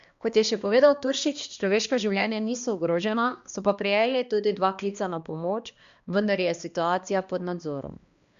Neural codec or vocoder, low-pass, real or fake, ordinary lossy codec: codec, 16 kHz, 2 kbps, X-Codec, HuBERT features, trained on balanced general audio; 7.2 kHz; fake; Opus, 64 kbps